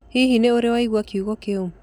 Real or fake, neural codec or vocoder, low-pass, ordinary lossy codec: real; none; 19.8 kHz; none